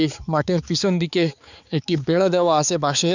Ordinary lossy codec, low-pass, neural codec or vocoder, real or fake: none; 7.2 kHz; codec, 16 kHz, 4 kbps, X-Codec, HuBERT features, trained on balanced general audio; fake